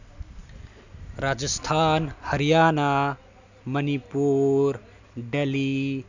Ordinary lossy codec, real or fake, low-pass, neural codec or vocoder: none; real; 7.2 kHz; none